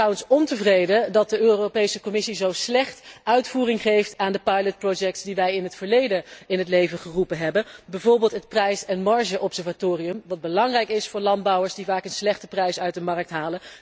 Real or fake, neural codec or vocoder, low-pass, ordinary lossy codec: real; none; none; none